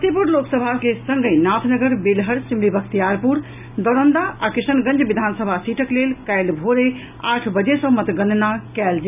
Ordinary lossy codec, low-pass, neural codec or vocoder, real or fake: none; 3.6 kHz; none; real